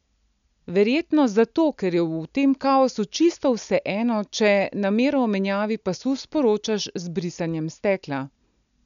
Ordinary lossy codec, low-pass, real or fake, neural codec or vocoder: none; 7.2 kHz; real; none